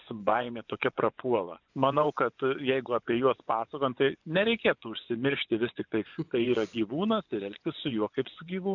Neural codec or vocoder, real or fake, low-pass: vocoder, 24 kHz, 100 mel bands, Vocos; fake; 7.2 kHz